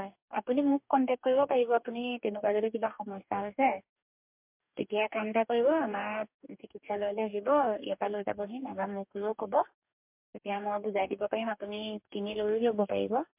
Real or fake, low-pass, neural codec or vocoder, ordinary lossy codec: fake; 3.6 kHz; codec, 44.1 kHz, 2.6 kbps, DAC; MP3, 32 kbps